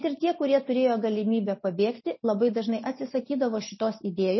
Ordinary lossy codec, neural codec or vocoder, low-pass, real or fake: MP3, 24 kbps; none; 7.2 kHz; real